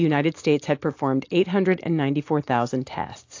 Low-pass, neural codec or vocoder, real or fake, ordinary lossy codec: 7.2 kHz; none; real; AAC, 48 kbps